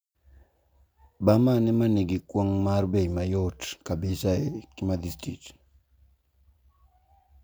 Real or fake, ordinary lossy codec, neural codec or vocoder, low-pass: real; none; none; none